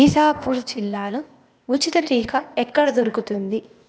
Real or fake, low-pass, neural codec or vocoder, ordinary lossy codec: fake; none; codec, 16 kHz, 0.8 kbps, ZipCodec; none